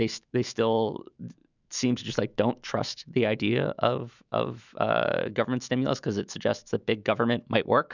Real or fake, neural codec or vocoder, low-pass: fake; autoencoder, 48 kHz, 128 numbers a frame, DAC-VAE, trained on Japanese speech; 7.2 kHz